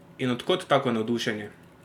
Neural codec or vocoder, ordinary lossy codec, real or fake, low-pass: none; none; real; 19.8 kHz